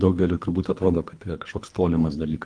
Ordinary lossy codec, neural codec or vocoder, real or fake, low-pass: Opus, 24 kbps; codec, 24 kHz, 3 kbps, HILCodec; fake; 9.9 kHz